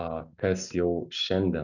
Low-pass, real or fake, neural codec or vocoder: 7.2 kHz; real; none